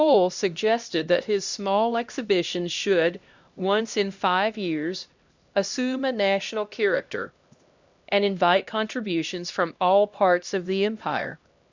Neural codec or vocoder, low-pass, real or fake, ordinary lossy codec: codec, 16 kHz, 1 kbps, X-Codec, HuBERT features, trained on LibriSpeech; 7.2 kHz; fake; Opus, 64 kbps